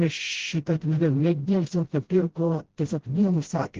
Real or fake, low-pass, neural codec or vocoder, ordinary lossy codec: fake; 7.2 kHz; codec, 16 kHz, 0.5 kbps, FreqCodec, smaller model; Opus, 16 kbps